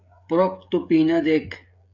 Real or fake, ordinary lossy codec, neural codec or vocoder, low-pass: fake; MP3, 64 kbps; codec, 16 kHz, 16 kbps, FreqCodec, smaller model; 7.2 kHz